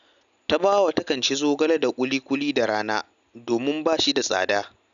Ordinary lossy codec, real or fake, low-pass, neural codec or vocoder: none; real; 7.2 kHz; none